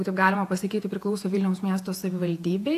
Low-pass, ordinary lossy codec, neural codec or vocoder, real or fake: 14.4 kHz; AAC, 64 kbps; vocoder, 48 kHz, 128 mel bands, Vocos; fake